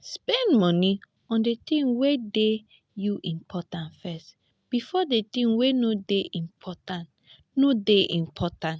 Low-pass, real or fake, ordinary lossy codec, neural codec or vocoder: none; real; none; none